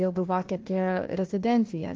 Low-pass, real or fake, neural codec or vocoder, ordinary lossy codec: 7.2 kHz; fake; codec, 16 kHz, 1 kbps, FunCodec, trained on Chinese and English, 50 frames a second; Opus, 16 kbps